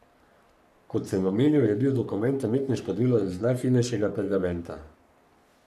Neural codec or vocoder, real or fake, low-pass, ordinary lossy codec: codec, 44.1 kHz, 3.4 kbps, Pupu-Codec; fake; 14.4 kHz; none